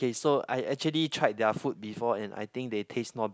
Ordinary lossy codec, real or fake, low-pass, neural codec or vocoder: none; real; none; none